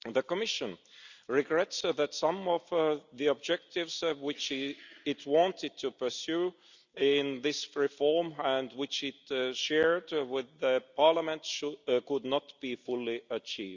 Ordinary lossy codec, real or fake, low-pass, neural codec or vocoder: Opus, 64 kbps; real; 7.2 kHz; none